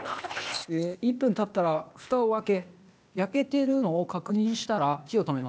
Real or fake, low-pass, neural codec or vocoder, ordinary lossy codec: fake; none; codec, 16 kHz, 0.8 kbps, ZipCodec; none